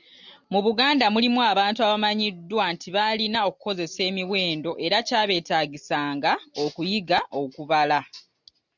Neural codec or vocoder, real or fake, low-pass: none; real; 7.2 kHz